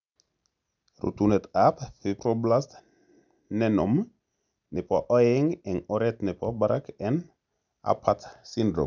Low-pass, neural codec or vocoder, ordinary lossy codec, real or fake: 7.2 kHz; none; none; real